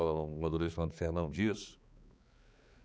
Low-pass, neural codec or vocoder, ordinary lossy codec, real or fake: none; codec, 16 kHz, 4 kbps, X-Codec, HuBERT features, trained on balanced general audio; none; fake